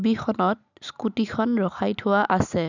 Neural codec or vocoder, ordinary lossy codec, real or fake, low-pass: none; none; real; 7.2 kHz